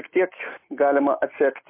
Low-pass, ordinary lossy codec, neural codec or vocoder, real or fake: 3.6 kHz; MP3, 24 kbps; none; real